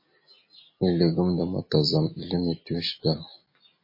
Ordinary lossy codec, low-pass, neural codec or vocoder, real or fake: MP3, 24 kbps; 5.4 kHz; none; real